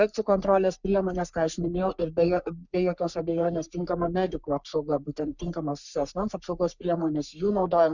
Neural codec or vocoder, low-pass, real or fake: codec, 44.1 kHz, 3.4 kbps, Pupu-Codec; 7.2 kHz; fake